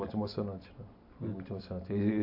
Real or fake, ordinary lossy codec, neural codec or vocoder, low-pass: real; AAC, 48 kbps; none; 5.4 kHz